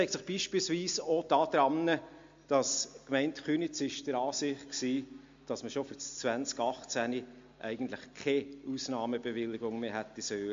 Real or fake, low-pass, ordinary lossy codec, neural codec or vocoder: real; 7.2 kHz; MP3, 48 kbps; none